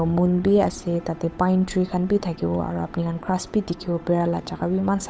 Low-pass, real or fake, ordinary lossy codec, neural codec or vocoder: none; fake; none; codec, 16 kHz, 8 kbps, FunCodec, trained on Chinese and English, 25 frames a second